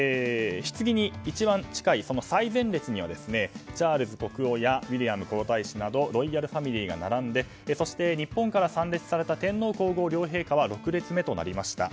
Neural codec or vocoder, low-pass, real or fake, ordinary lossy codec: none; none; real; none